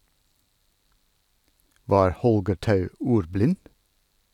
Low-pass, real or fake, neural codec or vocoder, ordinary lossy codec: 19.8 kHz; real; none; none